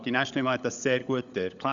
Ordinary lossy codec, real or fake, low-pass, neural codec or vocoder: Opus, 64 kbps; fake; 7.2 kHz; codec, 16 kHz, 16 kbps, FunCodec, trained on LibriTTS, 50 frames a second